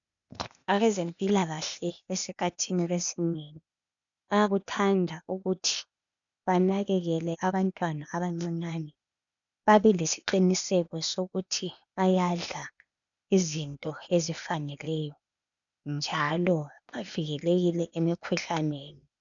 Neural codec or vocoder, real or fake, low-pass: codec, 16 kHz, 0.8 kbps, ZipCodec; fake; 7.2 kHz